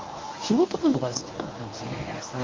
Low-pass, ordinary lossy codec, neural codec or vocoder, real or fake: 7.2 kHz; Opus, 32 kbps; codec, 24 kHz, 0.9 kbps, WavTokenizer, medium speech release version 1; fake